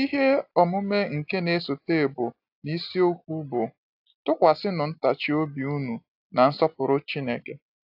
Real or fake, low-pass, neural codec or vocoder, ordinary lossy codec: real; 5.4 kHz; none; AAC, 48 kbps